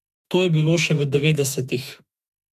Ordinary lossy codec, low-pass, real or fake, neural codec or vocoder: none; 14.4 kHz; fake; autoencoder, 48 kHz, 32 numbers a frame, DAC-VAE, trained on Japanese speech